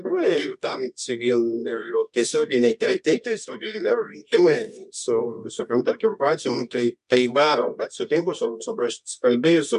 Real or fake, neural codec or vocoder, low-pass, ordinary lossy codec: fake; codec, 24 kHz, 0.9 kbps, WavTokenizer, medium music audio release; 10.8 kHz; MP3, 64 kbps